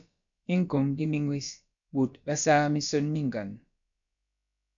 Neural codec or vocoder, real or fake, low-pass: codec, 16 kHz, about 1 kbps, DyCAST, with the encoder's durations; fake; 7.2 kHz